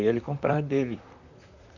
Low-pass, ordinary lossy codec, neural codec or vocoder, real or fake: 7.2 kHz; none; vocoder, 44.1 kHz, 128 mel bands, Pupu-Vocoder; fake